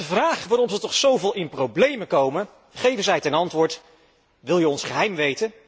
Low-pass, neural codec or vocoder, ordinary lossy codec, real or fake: none; none; none; real